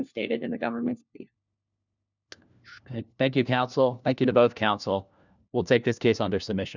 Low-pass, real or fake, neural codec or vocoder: 7.2 kHz; fake; codec, 16 kHz, 1 kbps, FunCodec, trained on LibriTTS, 50 frames a second